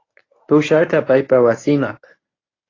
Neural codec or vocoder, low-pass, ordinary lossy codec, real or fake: codec, 24 kHz, 0.9 kbps, WavTokenizer, medium speech release version 1; 7.2 kHz; AAC, 32 kbps; fake